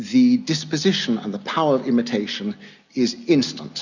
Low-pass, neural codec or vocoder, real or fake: 7.2 kHz; none; real